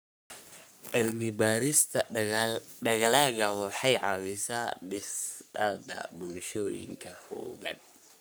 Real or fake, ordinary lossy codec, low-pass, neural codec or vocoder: fake; none; none; codec, 44.1 kHz, 3.4 kbps, Pupu-Codec